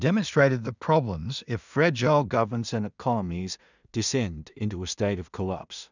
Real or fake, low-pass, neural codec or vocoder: fake; 7.2 kHz; codec, 16 kHz in and 24 kHz out, 0.4 kbps, LongCat-Audio-Codec, two codebook decoder